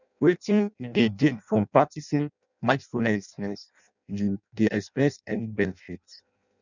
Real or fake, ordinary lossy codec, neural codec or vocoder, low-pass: fake; none; codec, 16 kHz in and 24 kHz out, 0.6 kbps, FireRedTTS-2 codec; 7.2 kHz